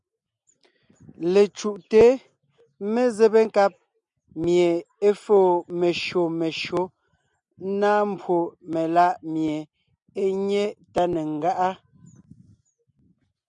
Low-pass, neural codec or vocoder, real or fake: 9.9 kHz; none; real